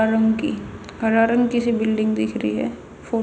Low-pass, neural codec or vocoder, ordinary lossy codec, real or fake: none; none; none; real